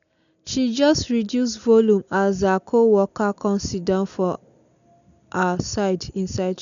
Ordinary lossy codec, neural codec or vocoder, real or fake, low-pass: none; none; real; 7.2 kHz